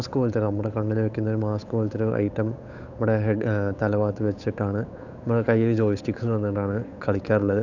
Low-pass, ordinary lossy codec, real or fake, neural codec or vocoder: 7.2 kHz; none; fake; codec, 16 kHz, 8 kbps, FunCodec, trained on Chinese and English, 25 frames a second